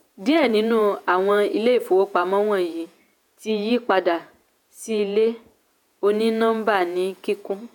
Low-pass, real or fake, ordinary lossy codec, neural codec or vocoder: 19.8 kHz; fake; none; vocoder, 48 kHz, 128 mel bands, Vocos